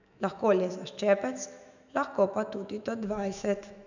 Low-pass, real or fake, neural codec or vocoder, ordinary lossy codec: 7.2 kHz; fake; autoencoder, 48 kHz, 128 numbers a frame, DAC-VAE, trained on Japanese speech; none